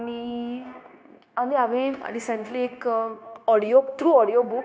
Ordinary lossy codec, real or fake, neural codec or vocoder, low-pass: none; fake; codec, 16 kHz, 0.9 kbps, LongCat-Audio-Codec; none